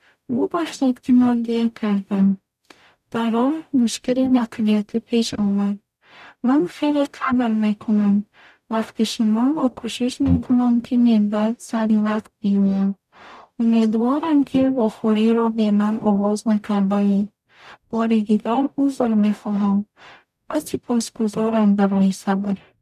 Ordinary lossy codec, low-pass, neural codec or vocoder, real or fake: none; 14.4 kHz; codec, 44.1 kHz, 0.9 kbps, DAC; fake